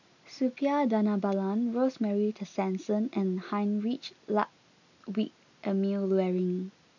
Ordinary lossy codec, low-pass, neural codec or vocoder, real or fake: none; 7.2 kHz; none; real